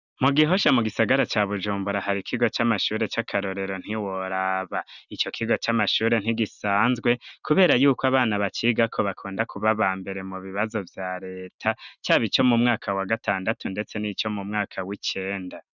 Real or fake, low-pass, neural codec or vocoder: real; 7.2 kHz; none